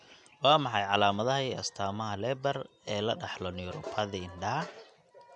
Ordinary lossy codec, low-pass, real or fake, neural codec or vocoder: none; 10.8 kHz; real; none